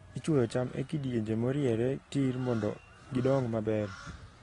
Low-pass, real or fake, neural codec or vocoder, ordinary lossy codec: 10.8 kHz; real; none; AAC, 32 kbps